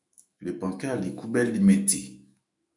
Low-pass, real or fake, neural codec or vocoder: 10.8 kHz; fake; autoencoder, 48 kHz, 128 numbers a frame, DAC-VAE, trained on Japanese speech